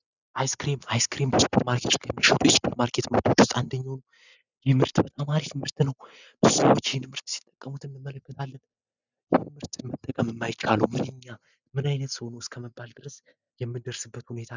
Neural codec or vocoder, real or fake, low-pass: none; real; 7.2 kHz